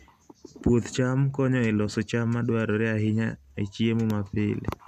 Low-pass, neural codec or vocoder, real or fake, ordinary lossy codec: 14.4 kHz; none; real; none